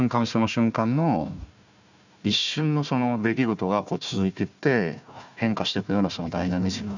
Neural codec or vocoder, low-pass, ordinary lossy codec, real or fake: codec, 16 kHz, 1 kbps, FunCodec, trained on Chinese and English, 50 frames a second; 7.2 kHz; none; fake